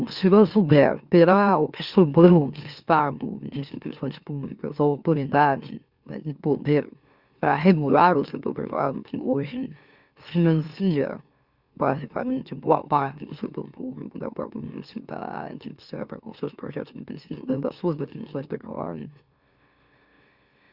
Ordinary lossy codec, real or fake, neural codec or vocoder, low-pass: Opus, 64 kbps; fake; autoencoder, 44.1 kHz, a latent of 192 numbers a frame, MeloTTS; 5.4 kHz